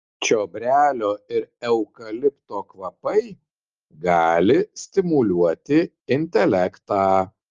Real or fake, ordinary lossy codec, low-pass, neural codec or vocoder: real; Opus, 32 kbps; 7.2 kHz; none